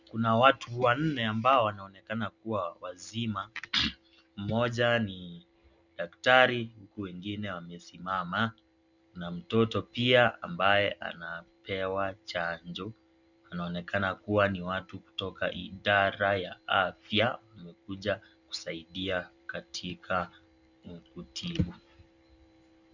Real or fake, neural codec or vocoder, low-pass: real; none; 7.2 kHz